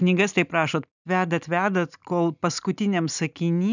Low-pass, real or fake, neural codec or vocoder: 7.2 kHz; real; none